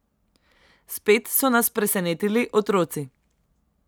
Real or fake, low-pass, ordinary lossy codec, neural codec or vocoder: real; none; none; none